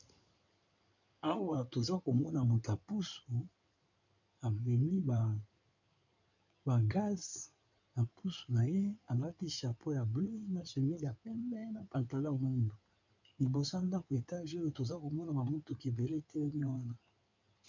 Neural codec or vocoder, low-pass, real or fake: codec, 16 kHz in and 24 kHz out, 2.2 kbps, FireRedTTS-2 codec; 7.2 kHz; fake